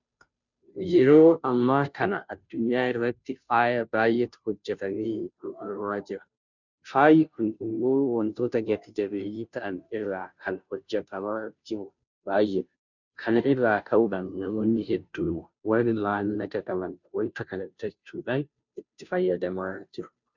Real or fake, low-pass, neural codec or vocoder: fake; 7.2 kHz; codec, 16 kHz, 0.5 kbps, FunCodec, trained on Chinese and English, 25 frames a second